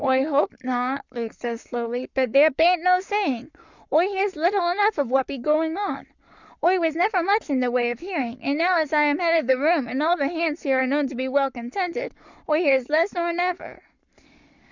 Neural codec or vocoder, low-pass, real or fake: codec, 44.1 kHz, 7.8 kbps, Pupu-Codec; 7.2 kHz; fake